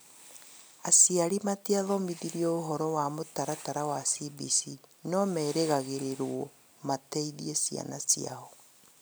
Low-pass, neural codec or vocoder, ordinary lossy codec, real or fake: none; none; none; real